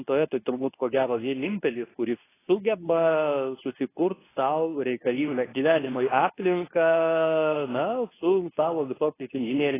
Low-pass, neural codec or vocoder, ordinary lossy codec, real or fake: 3.6 kHz; codec, 24 kHz, 0.9 kbps, WavTokenizer, medium speech release version 2; AAC, 16 kbps; fake